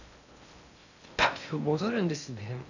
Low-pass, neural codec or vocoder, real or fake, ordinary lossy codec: 7.2 kHz; codec, 16 kHz in and 24 kHz out, 0.6 kbps, FocalCodec, streaming, 2048 codes; fake; none